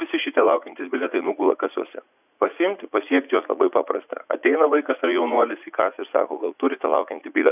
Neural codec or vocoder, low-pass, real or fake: vocoder, 44.1 kHz, 80 mel bands, Vocos; 3.6 kHz; fake